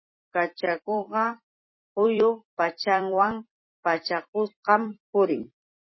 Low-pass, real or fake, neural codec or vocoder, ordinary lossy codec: 7.2 kHz; fake; vocoder, 44.1 kHz, 128 mel bands every 256 samples, BigVGAN v2; MP3, 24 kbps